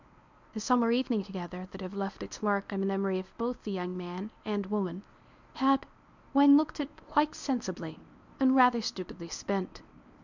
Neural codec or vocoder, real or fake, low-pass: codec, 24 kHz, 0.9 kbps, WavTokenizer, medium speech release version 1; fake; 7.2 kHz